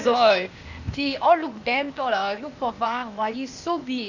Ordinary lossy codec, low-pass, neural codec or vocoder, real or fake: none; 7.2 kHz; codec, 16 kHz, 0.8 kbps, ZipCodec; fake